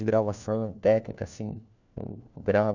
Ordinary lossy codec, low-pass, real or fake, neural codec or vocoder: none; 7.2 kHz; fake; codec, 16 kHz, 1 kbps, FunCodec, trained on LibriTTS, 50 frames a second